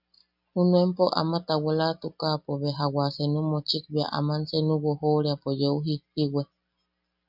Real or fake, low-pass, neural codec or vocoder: real; 5.4 kHz; none